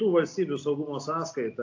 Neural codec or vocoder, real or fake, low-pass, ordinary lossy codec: none; real; 7.2 kHz; AAC, 48 kbps